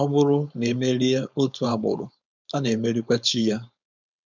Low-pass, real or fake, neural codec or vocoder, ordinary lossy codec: 7.2 kHz; fake; codec, 16 kHz, 4.8 kbps, FACodec; none